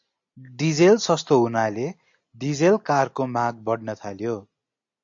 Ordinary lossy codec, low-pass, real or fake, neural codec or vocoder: AAC, 64 kbps; 7.2 kHz; real; none